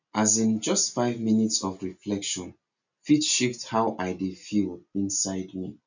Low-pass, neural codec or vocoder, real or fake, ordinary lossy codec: 7.2 kHz; none; real; none